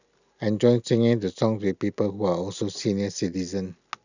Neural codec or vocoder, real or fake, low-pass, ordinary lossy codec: none; real; 7.2 kHz; none